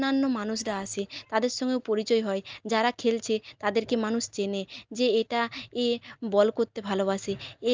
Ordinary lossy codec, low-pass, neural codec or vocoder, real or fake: Opus, 24 kbps; 7.2 kHz; none; real